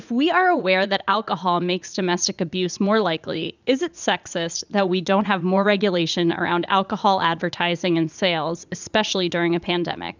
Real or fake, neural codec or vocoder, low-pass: fake; vocoder, 44.1 kHz, 80 mel bands, Vocos; 7.2 kHz